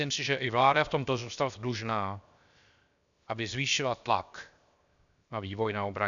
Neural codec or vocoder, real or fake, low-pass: codec, 16 kHz, 0.7 kbps, FocalCodec; fake; 7.2 kHz